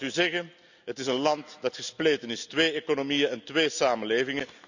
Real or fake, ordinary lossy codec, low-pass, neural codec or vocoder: real; none; 7.2 kHz; none